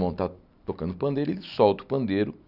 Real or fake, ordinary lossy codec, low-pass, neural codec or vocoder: real; none; 5.4 kHz; none